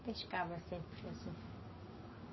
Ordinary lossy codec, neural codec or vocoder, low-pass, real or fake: MP3, 24 kbps; none; 7.2 kHz; real